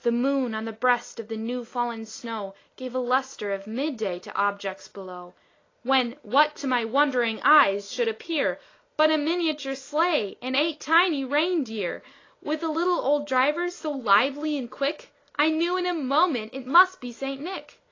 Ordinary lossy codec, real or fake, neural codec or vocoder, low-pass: AAC, 32 kbps; real; none; 7.2 kHz